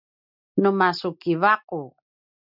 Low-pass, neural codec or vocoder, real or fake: 5.4 kHz; none; real